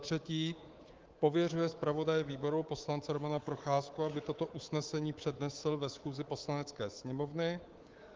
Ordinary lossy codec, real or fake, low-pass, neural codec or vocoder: Opus, 16 kbps; fake; 7.2 kHz; codec, 24 kHz, 3.1 kbps, DualCodec